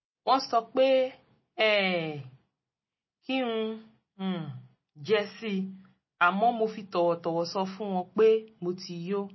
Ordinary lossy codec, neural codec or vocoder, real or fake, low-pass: MP3, 24 kbps; none; real; 7.2 kHz